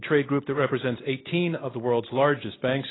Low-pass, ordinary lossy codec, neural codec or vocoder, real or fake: 7.2 kHz; AAC, 16 kbps; none; real